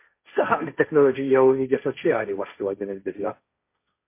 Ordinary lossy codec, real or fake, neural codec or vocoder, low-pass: MP3, 24 kbps; fake; codec, 16 kHz, 1.1 kbps, Voila-Tokenizer; 3.6 kHz